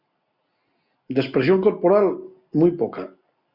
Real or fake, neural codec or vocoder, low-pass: real; none; 5.4 kHz